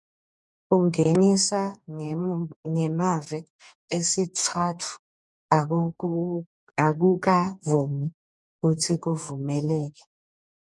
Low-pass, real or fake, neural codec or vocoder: 10.8 kHz; fake; codec, 44.1 kHz, 2.6 kbps, DAC